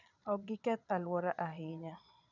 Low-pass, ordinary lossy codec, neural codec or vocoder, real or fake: 7.2 kHz; none; vocoder, 22.05 kHz, 80 mel bands, Vocos; fake